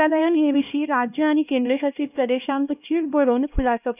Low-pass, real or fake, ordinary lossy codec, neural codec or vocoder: 3.6 kHz; fake; none; codec, 16 kHz, 1 kbps, X-Codec, HuBERT features, trained on LibriSpeech